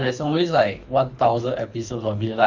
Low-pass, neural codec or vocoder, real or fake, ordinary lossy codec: 7.2 kHz; codec, 24 kHz, 3 kbps, HILCodec; fake; none